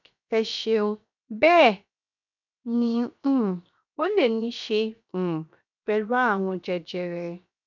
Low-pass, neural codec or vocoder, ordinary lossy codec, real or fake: 7.2 kHz; codec, 16 kHz, 0.7 kbps, FocalCodec; none; fake